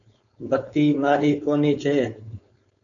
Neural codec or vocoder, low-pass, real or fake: codec, 16 kHz, 4.8 kbps, FACodec; 7.2 kHz; fake